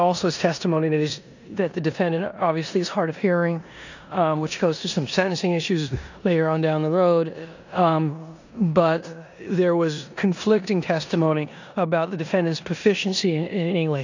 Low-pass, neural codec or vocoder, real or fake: 7.2 kHz; codec, 16 kHz in and 24 kHz out, 0.9 kbps, LongCat-Audio-Codec, four codebook decoder; fake